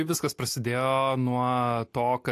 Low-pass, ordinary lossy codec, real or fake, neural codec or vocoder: 14.4 kHz; AAC, 64 kbps; real; none